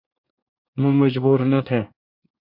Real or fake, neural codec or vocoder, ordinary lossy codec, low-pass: fake; codec, 24 kHz, 1 kbps, SNAC; MP3, 48 kbps; 5.4 kHz